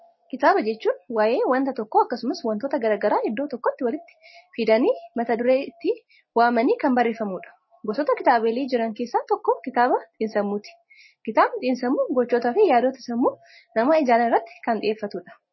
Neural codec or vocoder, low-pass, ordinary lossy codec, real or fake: none; 7.2 kHz; MP3, 24 kbps; real